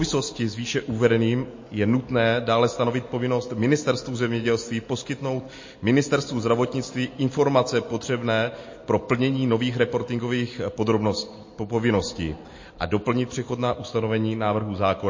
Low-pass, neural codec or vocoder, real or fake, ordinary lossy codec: 7.2 kHz; none; real; MP3, 32 kbps